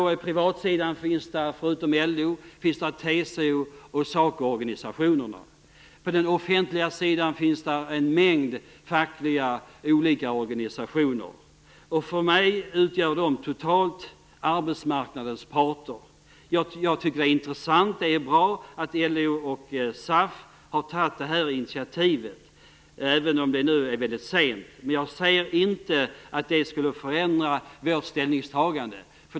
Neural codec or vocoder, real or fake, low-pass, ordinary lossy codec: none; real; none; none